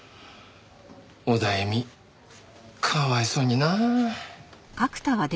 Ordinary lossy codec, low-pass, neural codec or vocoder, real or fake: none; none; none; real